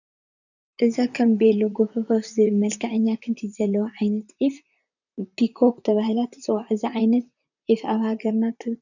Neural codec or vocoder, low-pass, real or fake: vocoder, 44.1 kHz, 128 mel bands, Pupu-Vocoder; 7.2 kHz; fake